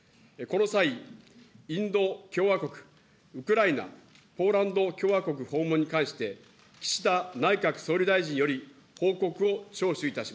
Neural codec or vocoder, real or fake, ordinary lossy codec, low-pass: none; real; none; none